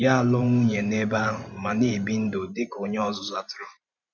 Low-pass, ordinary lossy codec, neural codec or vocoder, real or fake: 7.2 kHz; none; vocoder, 44.1 kHz, 128 mel bands every 512 samples, BigVGAN v2; fake